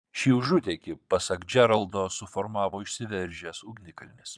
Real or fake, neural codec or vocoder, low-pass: fake; vocoder, 22.05 kHz, 80 mel bands, Vocos; 9.9 kHz